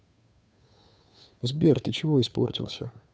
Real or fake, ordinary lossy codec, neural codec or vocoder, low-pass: fake; none; codec, 16 kHz, 2 kbps, FunCodec, trained on Chinese and English, 25 frames a second; none